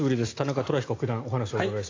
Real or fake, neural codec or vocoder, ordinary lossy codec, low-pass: real; none; AAC, 32 kbps; 7.2 kHz